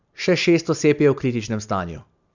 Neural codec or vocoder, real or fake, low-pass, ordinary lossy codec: none; real; 7.2 kHz; none